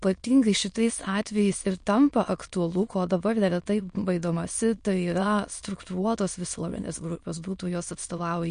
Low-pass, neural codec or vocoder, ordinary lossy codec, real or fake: 9.9 kHz; autoencoder, 22.05 kHz, a latent of 192 numbers a frame, VITS, trained on many speakers; MP3, 48 kbps; fake